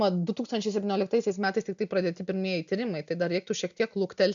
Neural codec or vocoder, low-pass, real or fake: none; 7.2 kHz; real